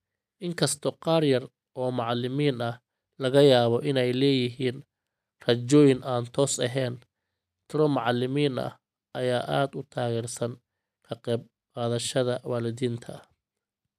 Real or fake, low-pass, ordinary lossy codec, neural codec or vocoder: real; 14.4 kHz; none; none